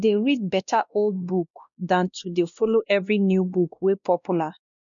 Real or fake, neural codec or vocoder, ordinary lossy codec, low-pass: fake; codec, 16 kHz, 1 kbps, X-Codec, WavLM features, trained on Multilingual LibriSpeech; none; 7.2 kHz